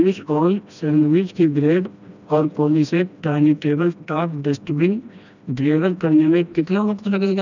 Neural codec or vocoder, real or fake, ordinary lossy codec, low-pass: codec, 16 kHz, 1 kbps, FreqCodec, smaller model; fake; none; 7.2 kHz